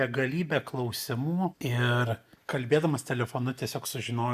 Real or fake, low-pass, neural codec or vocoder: real; 14.4 kHz; none